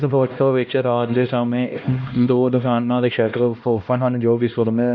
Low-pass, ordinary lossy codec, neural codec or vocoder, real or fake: 7.2 kHz; none; codec, 16 kHz, 1 kbps, X-Codec, HuBERT features, trained on LibriSpeech; fake